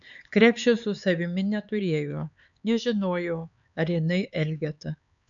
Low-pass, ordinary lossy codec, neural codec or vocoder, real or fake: 7.2 kHz; MP3, 96 kbps; codec, 16 kHz, 4 kbps, X-Codec, HuBERT features, trained on LibriSpeech; fake